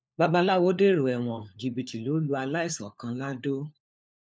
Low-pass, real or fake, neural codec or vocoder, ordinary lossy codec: none; fake; codec, 16 kHz, 4 kbps, FunCodec, trained on LibriTTS, 50 frames a second; none